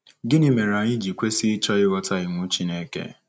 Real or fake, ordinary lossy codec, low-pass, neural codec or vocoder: real; none; none; none